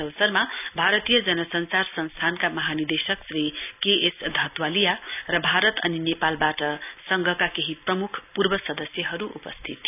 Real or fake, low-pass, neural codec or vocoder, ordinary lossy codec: real; 3.6 kHz; none; none